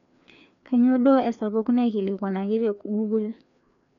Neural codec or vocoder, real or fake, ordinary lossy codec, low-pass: codec, 16 kHz, 2 kbps, FreqCodec, larger model; fake; none; 7.2 kHz